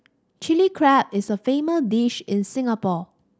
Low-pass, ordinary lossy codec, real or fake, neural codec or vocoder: none; none; real; none